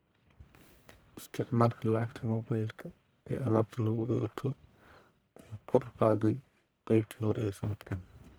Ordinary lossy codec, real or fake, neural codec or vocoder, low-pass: none; fake; codec, 44.1 kHz, 1.7 kbps, Pupu-Codec; none